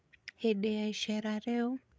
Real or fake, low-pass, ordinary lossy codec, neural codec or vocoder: fake; none; none; codec, 16 kHz, 8 kbps, FreqCodec, larger model